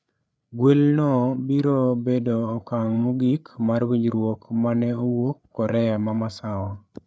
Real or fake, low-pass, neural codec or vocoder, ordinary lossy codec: fake; none; codec, 16 kHz, 8 kbps, FreqCodec, larger model; none